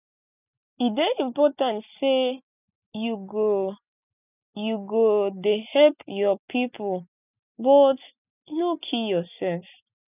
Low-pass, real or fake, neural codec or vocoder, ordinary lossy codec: 3.6 kHz; real; none; none